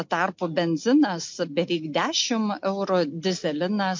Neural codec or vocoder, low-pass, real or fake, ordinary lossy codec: none; 7.2 kHz; real; MP3, 48 kbps